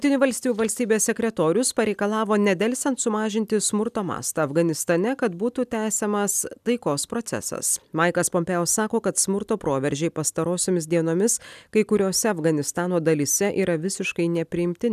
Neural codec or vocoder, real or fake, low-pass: none; real; 14.4 kHz